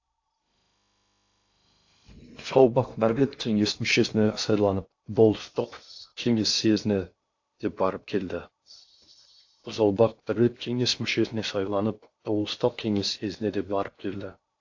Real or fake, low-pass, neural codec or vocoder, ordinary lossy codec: fake; 7.2 kHz; codec, 16 kHz in and 24 kHz out, 0.6 kbps, FocalCodec, streaming, 2048 codes; AAC, 48 kbps